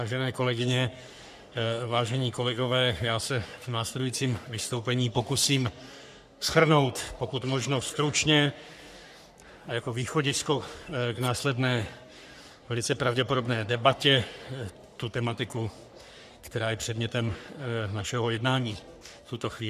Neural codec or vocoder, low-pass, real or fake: codec, 44.1 kHz, 3.4 kbps, Pupu-Codec; 14.4 kHz; fake